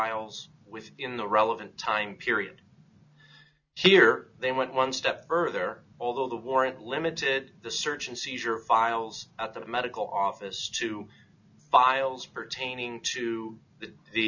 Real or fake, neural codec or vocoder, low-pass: real; none; 7.2 kHz